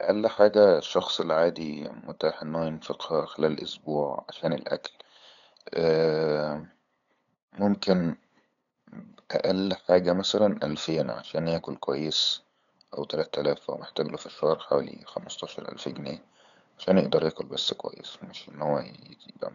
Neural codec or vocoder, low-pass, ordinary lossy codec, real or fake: codec, 16 kHz, 8 kbps, FunCodec, trained on LibriTTS, 25 frames a second; 7.2 kHz; none; fake